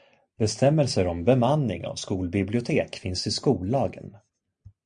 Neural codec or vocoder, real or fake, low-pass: none; real; 9.9 kHz